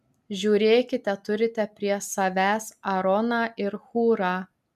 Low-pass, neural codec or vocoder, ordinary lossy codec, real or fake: 14.4 kHz; none; MP3, 96 kbps; real